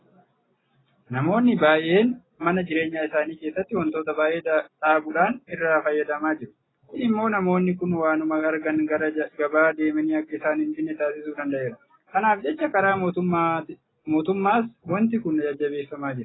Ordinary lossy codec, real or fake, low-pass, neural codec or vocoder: AAC, 16 kbps; real; 7.2 kHz; none